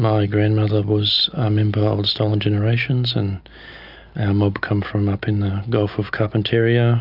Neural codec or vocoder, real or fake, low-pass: none; real; 5.4 kHz